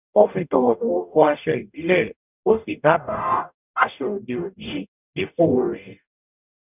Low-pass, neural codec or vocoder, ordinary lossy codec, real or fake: 3.6 kHz; codec, 44.1 kHz, 0.9 kbps, DAC; none; fake